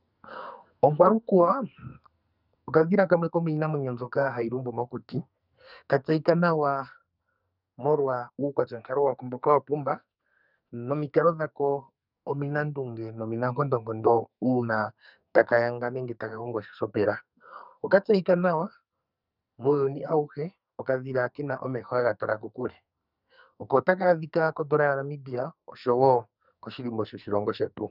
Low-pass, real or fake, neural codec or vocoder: 5.4 kHz; fake; codec, 44.1 kHz, 2.6 kbps, SNAC